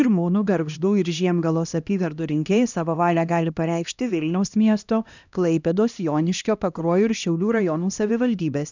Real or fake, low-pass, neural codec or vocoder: fake; 7.2 kHz; codec, 16 kHz, 1 kbps, X-Codec, HuBERT features, trained on LibriSpeech